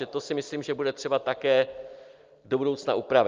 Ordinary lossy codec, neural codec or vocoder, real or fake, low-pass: Opus, 24 kbps; none; real; 7.2 kHz